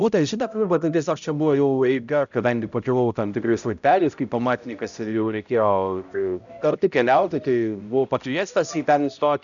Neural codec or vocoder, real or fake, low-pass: codec, 16 kHz, 0.5 kbps, X-Codec, HuBERT features, trained on balanced general audio; fake; 7.2 kHz